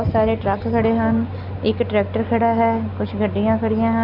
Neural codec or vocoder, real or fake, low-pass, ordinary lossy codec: none; real; 5.4 kHz; none